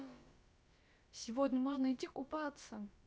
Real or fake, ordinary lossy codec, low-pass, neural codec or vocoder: fake; none; none; codec, 16 kHz, about 1 kbps, DyCAST, with the encoder's durations